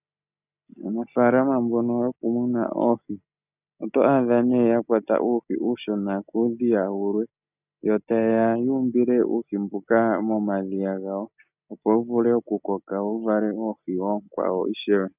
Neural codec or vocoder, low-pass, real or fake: none; 3.6 kHz; real